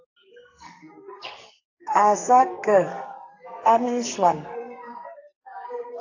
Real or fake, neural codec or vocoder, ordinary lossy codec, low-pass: fake; codec, 44.1 kHz, 2.6 kbps, SNAC; AAC, 48 kbps; 7.2 kHz